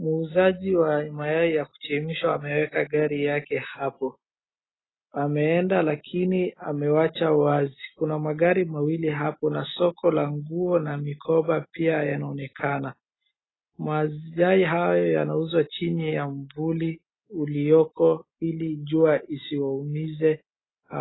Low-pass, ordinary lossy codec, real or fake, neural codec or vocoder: 7.2 kHz; AAC, 16 kbps; real; none